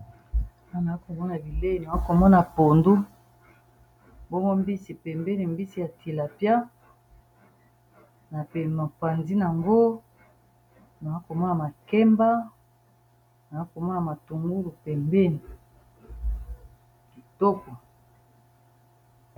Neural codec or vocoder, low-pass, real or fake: none; 19.8 kHz; real